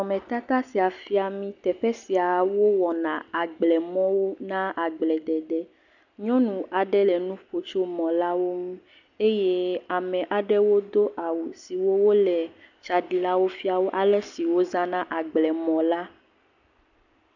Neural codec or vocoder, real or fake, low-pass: none; real; 7.2 kHz